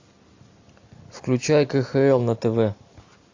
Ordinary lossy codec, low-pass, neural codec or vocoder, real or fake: AAC, 48 kbps; 7.2 kHz; none; real